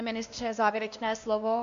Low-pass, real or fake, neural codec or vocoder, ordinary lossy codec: 7.2 kHz; fake; codec, 16 kHz, 1 kbps, X-Codec, WavLM features, trained on Multilingual LibriSpeech; AAC, 64 kbps